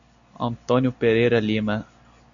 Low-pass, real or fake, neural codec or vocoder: 7.2 kHz; real; none